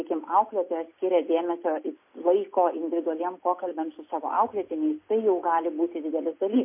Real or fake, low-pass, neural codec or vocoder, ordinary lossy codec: real; 3.6 kHz; none; MP3, 24 kbps